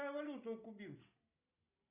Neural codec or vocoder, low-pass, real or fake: none; 3.6 kHz; real